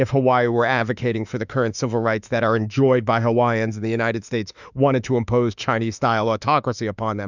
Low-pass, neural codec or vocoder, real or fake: 7.2 kHz; autoencoder, 48 kHz, 32 numbers a frame, DAC-VAE, trained on Japanese speech; fake